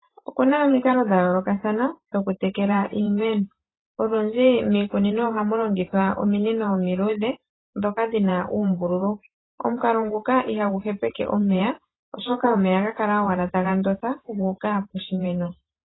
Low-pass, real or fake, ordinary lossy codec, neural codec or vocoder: 7.2 kHz; fake; AAC, 16 kbps; vocoder, 44.1 kHz, 128 mel bands every 512 samples, BigVGAN v2